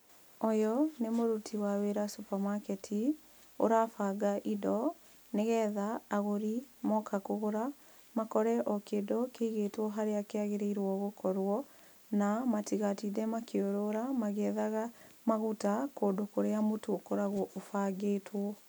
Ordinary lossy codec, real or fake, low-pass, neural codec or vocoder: none; real; none; none